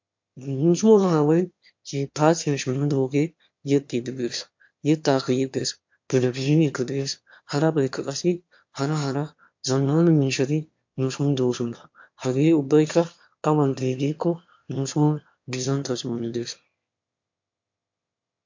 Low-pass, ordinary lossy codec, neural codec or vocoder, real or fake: 7.2 kHz; MP3, 48 kbps; autoencoder, 22.05 kHz, a latent of 192 numbers a frame, VITS, trained on one speaker; fake